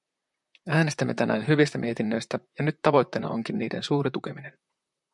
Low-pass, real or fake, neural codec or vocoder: 10.8 kHz; fake; vocoder, 44.1 kHz, 128 mel bands, Pupu-Vocoder